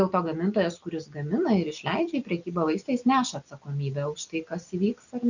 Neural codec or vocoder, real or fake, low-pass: none; real; 7.2 kHz